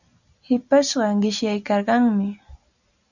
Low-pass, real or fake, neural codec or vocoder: 7.2 kHz; real; none